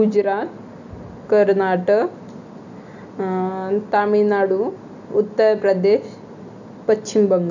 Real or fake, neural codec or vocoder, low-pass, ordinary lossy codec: real; none; 7.2 kHz; none